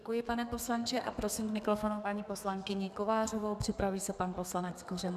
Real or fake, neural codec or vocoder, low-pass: fake; codec, 32 kHz, 1.9 kbps, SNAC; 14.4 kHz